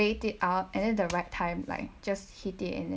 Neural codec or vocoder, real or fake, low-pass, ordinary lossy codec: none; real; none; none